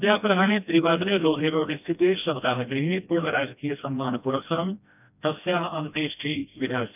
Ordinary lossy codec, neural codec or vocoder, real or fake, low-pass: none; codec, 16 kHz, 1 kbps, FreqCodec, smaller model; fake; 3.6 kHz